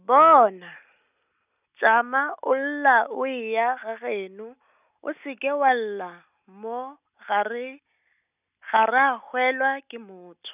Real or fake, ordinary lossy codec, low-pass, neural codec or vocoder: real; none; 3.6 kHz; none